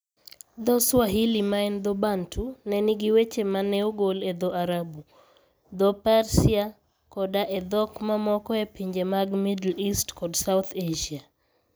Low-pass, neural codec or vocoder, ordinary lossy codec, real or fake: none; none; none; real